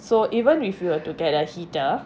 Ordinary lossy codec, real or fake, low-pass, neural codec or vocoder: none; real; none; none